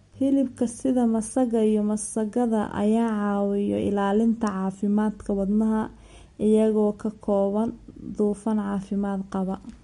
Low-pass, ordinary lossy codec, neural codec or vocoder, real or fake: 10.8 kHz; MP3, 48 kbps; none; real